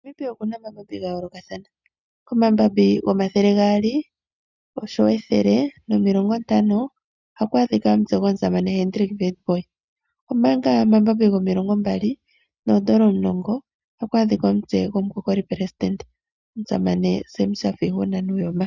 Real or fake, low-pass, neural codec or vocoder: real; 7.2 kHz; none